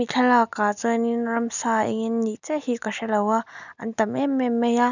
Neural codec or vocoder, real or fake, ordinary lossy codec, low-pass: none; real; none; 7.2 kHz